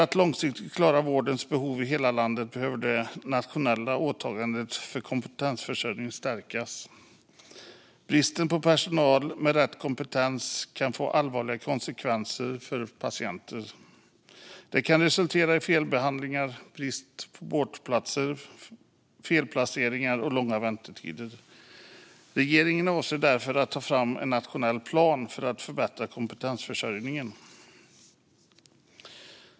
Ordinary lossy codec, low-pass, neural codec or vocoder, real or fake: none; none; none; real